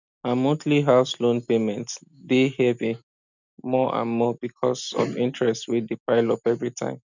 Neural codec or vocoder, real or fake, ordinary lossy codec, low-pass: none; real; none; 7.2 kHz